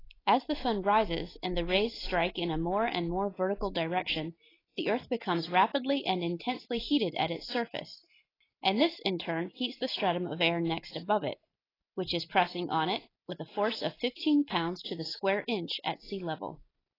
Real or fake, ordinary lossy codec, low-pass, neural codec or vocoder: real; AAC, 24 kbps; 5.4 kHz; none